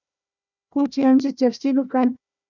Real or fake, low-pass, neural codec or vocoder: fake; 7.2 kHz; codec, 16 kHz, 1 kbps, FunCodec, trained on Chinese and English, 50 frames a second